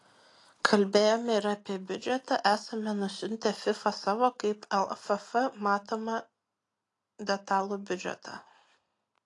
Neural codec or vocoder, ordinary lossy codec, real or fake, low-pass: none; AAC, 48 kbps; real; 10.8 kHz